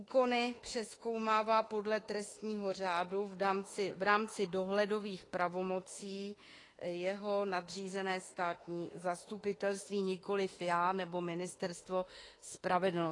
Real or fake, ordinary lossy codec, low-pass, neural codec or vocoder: fake; AAC, 32 kbps; 10.8 kHz; autoencoder, 48 kHz, 32 numbers a frame, DAC-VAE, trained on Japanese speech